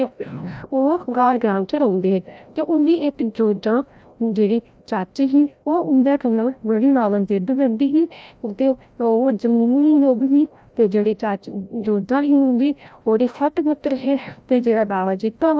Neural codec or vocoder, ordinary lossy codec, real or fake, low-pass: codec, 16 kHz, 0.5 kbps, FreqCodec, larger model; none; fake; none